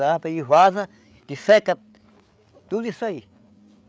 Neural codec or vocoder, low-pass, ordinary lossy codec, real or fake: codec, 16 kHz, 8 kbps, FreqCodec, larger model; none; none; fake